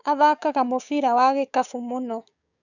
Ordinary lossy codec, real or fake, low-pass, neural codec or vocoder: none; fake; 7.2 kHz; codec, 16 kHz, 6 kbps, DAC